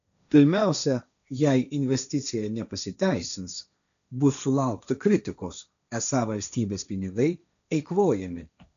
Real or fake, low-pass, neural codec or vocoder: fake; 7.2 kHz; codec, 16 kHz, 1.1 kbps, Voila-Tokenizer